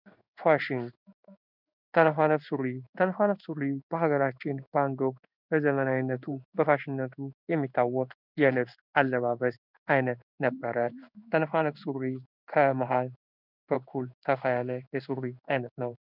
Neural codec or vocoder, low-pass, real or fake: codec, 16 kHz in and 24 kHz out, 1 kbps, XY-Tokenizer; 5.4 kHz; fake